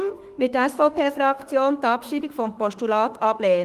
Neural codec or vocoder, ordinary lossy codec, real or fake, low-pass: autoencoder, 48 kHz, 32 numbers a frame, DAC-VAE, trained on Japanese speech; Opus, 24 kbps; fake; 14.4 kHz